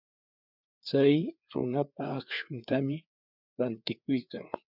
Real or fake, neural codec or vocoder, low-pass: fake; codec, 16 kHz, 4 kbps, FreqCodec, larger model; 5.4 kHz